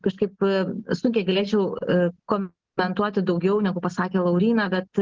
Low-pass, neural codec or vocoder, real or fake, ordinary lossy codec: 7.2 kHz; none; real; Opus, 16 kbps